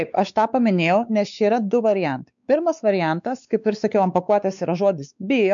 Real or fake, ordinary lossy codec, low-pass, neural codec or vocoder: fake; MP3, 96 kbps; 7.2 kHz; codec, 16 kHz, 4 kbps, X-Codec, WavLM features, trained on Multilingual LibriSpeech